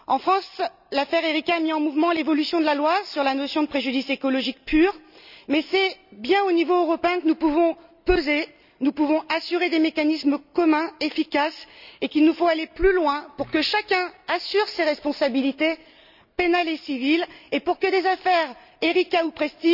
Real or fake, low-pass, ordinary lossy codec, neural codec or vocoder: real; 5.4 kHz; none; none